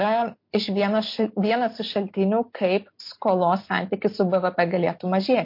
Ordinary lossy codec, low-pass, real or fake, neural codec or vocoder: MP3, 32 kbps; 5.4 kHz; real; none